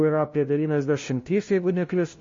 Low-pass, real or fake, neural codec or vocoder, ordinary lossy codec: 7.2 kHz; fake; codec, 16 kHz, 0.5 kbps, FunCodec, trained on LibriTTS, 25 frames a second; MP3, 32 kbps